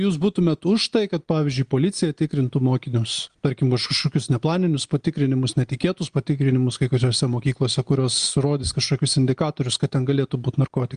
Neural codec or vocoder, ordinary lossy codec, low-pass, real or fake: none; Opus, 32 kbps; 9.9 kHz; real